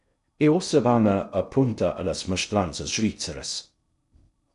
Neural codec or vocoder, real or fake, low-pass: codec, 16 kHz in and 24 kHz out, 0.6 kbps, FocalCodec, streaming, 2048 codes; fake; 10.8 kHz